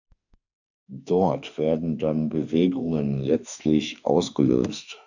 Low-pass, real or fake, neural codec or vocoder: 7.2 kHz; fake; autoencoder, 48 kHz, 32 numbers a frame, DAC-VAE, trained on Japanese speech